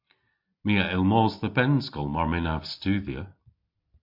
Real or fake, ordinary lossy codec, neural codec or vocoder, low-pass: real; MP3, 48 kbps; none; 5.4 kHz